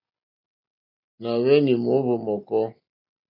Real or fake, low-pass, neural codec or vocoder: real; 5.4 kHz; none